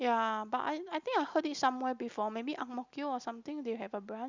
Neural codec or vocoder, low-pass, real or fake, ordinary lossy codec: none; 7.2 kHz; real; Opus, 64 kbps